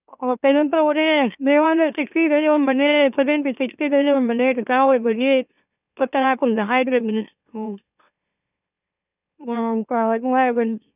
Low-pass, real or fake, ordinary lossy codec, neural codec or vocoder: 3.6 kHz; fake; none; autoencoder, 44.1 kHz, a latent of 192 numbers a frame, MeloTTS